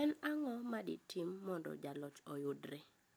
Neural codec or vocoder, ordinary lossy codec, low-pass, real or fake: none; none; none; real